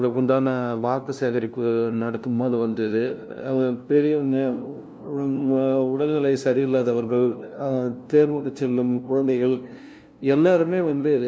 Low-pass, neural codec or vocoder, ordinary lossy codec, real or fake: none; codec, 16 kHz, 0.5 kbps, FunCodec, trained on LibriTTS, 25 frames a second; none; fake